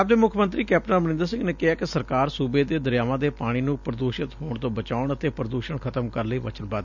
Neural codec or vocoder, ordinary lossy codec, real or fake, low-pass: none; none; real; 7.2 kHz